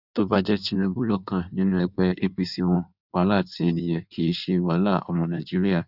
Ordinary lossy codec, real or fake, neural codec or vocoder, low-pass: none; fake; codec, 16 kHz in and 24 kHz out, 1.1 kbps, FireRedTTS-2 codec; 5.4 kHz